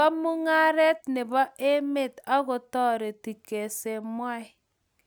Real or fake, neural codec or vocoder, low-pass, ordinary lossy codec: real; none; none; none